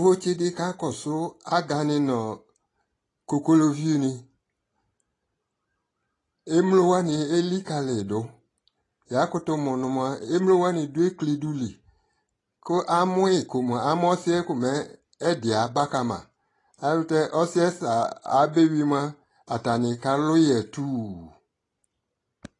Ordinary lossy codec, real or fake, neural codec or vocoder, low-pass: AAC, 32 kbps; real; none; 10.8 kHz